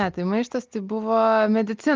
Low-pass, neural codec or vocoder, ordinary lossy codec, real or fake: 7.2 kHz; none; Opus, 32 kbps; real